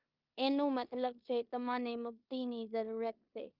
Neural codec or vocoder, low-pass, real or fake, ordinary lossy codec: codec, 16 kHz in and 24 kHz out, 0.9 kbps, LongCat-Audio-Codec, four codebook decoder; 5.4 kHz; fake; Opus, 24 kbps